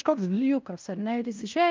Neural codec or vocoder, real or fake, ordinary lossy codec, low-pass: codec, 16 kHz in and 24 kHz out, 0.9 kbps, LongCat-Audio-Codec, fine tuned four codebook decoder; fake; Opus, 24 kbps; 7.2 kHz